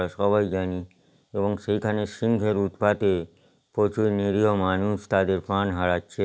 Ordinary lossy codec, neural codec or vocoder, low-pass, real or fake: none; none; none; real